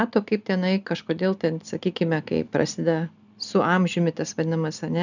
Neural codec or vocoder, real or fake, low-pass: none; real; 7.2 kHz